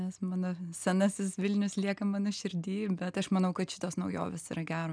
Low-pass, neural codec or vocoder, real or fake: 9.9 kHz; none; real